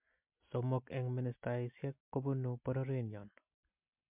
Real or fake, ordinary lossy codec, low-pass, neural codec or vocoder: real; MP3, 32 kbps; 3.6 kHz; none